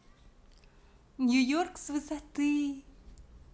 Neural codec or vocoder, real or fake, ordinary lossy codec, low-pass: none; real; none; none